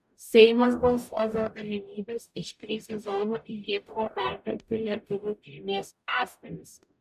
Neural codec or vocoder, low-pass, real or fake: codec, 44.1 kHz, 0.9 kbps, DAC; 14.4 kHz; fake